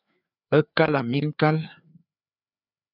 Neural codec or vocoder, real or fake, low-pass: codec, 16 kHz, 4 kbps, FreqCodec, larger model; fake; 5.4 kHz